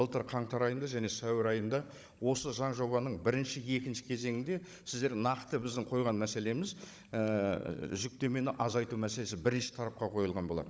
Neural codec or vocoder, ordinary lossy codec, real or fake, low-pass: codec, 16 kHz, 16 kbps, FunCodec, trained on Chinese and English, 50 frames a second; none; fake; none